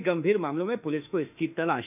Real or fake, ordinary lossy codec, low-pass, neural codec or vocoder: fake; none; 3.6 kHz; autoencoder, 48 kHz, 32 numbers a frame, DAC-VAE, trained on Japanese speech